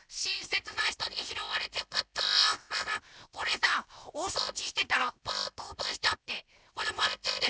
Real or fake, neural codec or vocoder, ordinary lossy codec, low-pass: fake; codec, 16 kHz, 0.7 kbps, FocalCodec; none; none